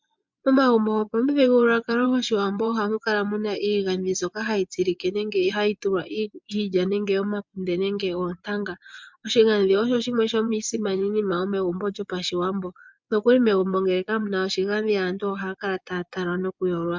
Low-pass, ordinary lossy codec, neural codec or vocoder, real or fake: 7.2 kHz; MP3, 64 kbps; vocoder, 24 kHz, 100 mel bands, Vocos; fake